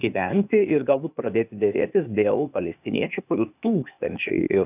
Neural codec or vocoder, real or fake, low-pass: codec, 16 kHz, 0.8 kbps, ZipCodec; fake; 3.6 kHz